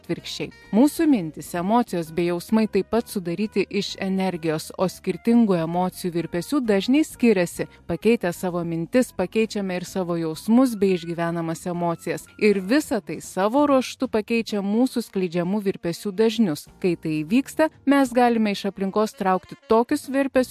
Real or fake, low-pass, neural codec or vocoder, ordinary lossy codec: real; 14.4 kHz; none; MP3, 64 kbps